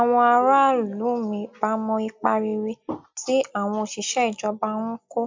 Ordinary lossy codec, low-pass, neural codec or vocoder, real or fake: MP3, 64 kbps; 7.2 kHz; none; real